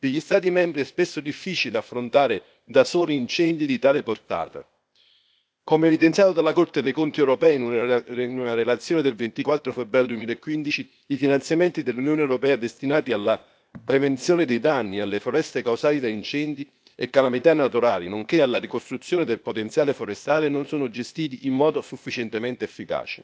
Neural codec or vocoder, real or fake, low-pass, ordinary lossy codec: codec, 16 kHz, 0.8 kbps, ZipCodec; fake; none; none